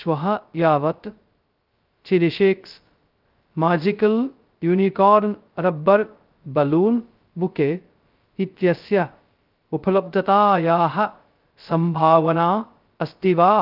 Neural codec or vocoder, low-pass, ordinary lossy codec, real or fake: codec, 16 kHz, 0.2 kbps, FocalCodec; 5.4 kHz; Opus, 32 kbps; fake